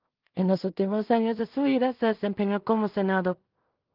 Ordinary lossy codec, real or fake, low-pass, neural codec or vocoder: Opus, 32 kbps; fake; 5.4 kHz; codec, 16 kHz in and 24 kHz out, 0.4 kbps, LongCat-Audio-Codec, two codebook decoder